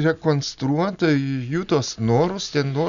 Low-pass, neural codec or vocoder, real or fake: 7.2 kHz; none; real